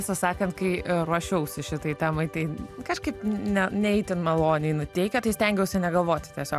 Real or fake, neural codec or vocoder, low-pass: real; none; 14.4 kHz